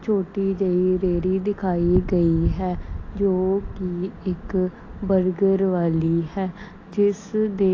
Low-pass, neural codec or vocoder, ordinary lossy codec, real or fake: 7.2 kHz; none; AAC, 32 kbps; real